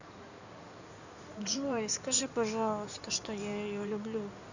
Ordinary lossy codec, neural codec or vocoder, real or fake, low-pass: none; codec, 16 kHz in and 24 kHz out, 2.2 kbps, FireRedTTS-2 codec; fake; 7.2 kHz